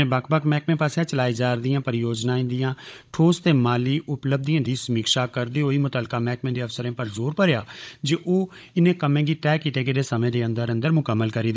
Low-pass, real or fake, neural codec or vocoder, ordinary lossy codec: none; fake; codec, 16 kHz, 16 kbps, FunCodec, trained on Chinese and English, 50 frames a second; none